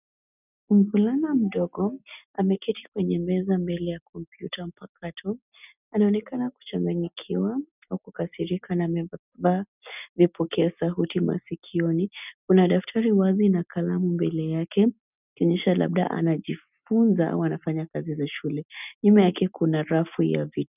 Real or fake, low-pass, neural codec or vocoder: real; 3.6 kHz; none